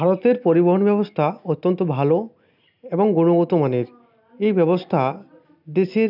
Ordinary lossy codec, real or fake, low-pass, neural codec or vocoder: none; real; 5.4 kHz; none